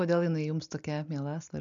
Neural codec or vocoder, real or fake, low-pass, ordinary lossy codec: none; real; 7.2 kHz; MP3, 96 kbps